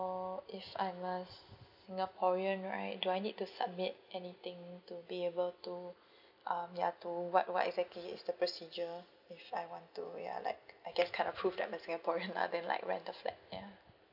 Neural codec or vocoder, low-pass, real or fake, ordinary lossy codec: none; 5.4 kHz; real; none